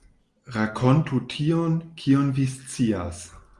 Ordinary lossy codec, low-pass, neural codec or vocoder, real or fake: Opus, 32 kbps; 10.8 kHz; none; real